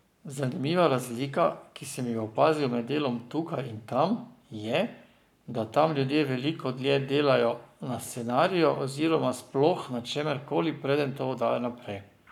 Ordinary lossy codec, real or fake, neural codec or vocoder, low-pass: none; fake; codec, 44.1 kHz, 7.8 kbps, Pupu-Codec; 19.8 kHz